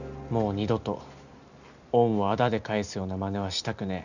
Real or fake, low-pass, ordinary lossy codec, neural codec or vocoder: real; 7.2 kHz; none; none